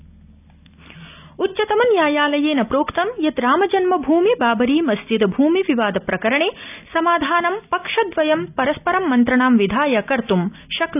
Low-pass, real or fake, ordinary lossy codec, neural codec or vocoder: 3.6 kHz; real; none; none